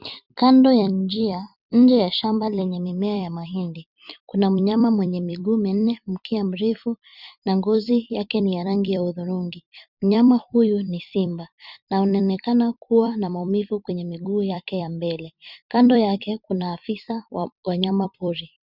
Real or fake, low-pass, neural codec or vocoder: fake; 5.4 kHz; vocoder, 44.1 kHz, 128 mel bands every 256 samples, BigVGAN v2